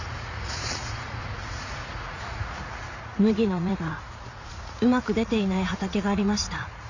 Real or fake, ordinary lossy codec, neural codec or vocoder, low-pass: fake; none; vocoder, 44.1 kHz, 80 mel bands, Vocos; 7.2 kHz